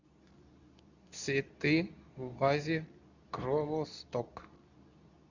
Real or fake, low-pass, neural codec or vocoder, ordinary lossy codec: fake; 7.2 kHz; codec, 24 kHz, 0.9 kbps, WavTokenizer, medium speech release version 2; none